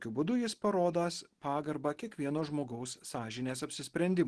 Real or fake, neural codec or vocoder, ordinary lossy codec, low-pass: real; none; Opus, 16 kbps; 10.8 kHz